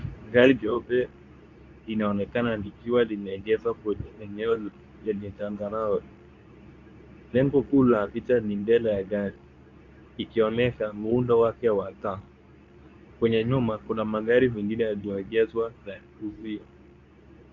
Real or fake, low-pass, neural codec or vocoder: fake; 7.2 kHz; codec, 24 kHz, 0.9 kbps, WavTokenizer, medium speech release version 2